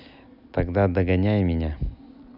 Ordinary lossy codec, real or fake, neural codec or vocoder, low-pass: none; real; none; 5.4 kHz